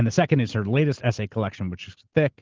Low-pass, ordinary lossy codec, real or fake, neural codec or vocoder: 7.2 kHz; Opus, 16 kbps; real; none